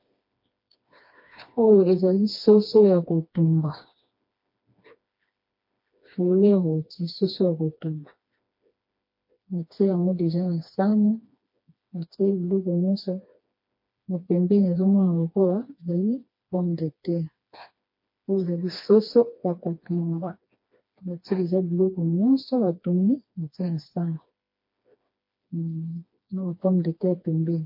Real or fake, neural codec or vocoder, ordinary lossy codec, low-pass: fake; codec, 16 kHz, 2 kbps, FreqCodec, smaller model; MP3, 32 kbps; 5.4 kHz